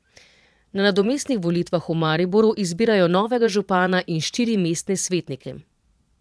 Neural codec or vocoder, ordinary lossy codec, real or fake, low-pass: vocoder, 22.05 kHz, 80 mel bands, Vocos; none; fake; none